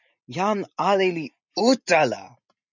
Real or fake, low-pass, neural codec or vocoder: real; 7.2 kHz; none